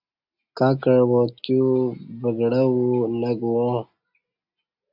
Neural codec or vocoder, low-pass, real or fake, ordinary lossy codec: none; 5.4 kHz; real; AAC, 48 kbps